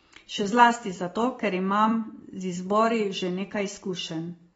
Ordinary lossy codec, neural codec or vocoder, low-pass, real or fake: AAC, 24 kbps; none; 19.8 kHz; real